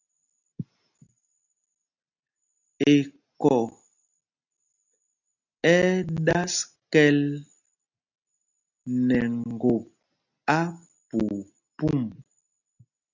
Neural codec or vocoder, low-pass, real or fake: none; 7.2 kHz; real